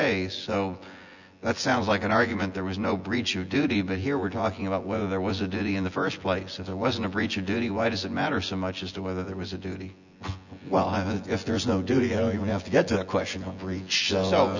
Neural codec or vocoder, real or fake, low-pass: vocoder, 24 kHz, 100 mel bands, Vocos; fake; 7.2 kHz